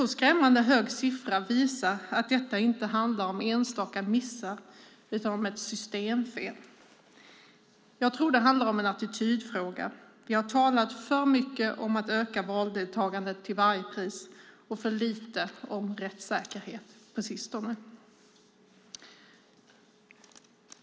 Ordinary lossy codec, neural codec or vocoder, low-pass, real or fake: none; none; none; real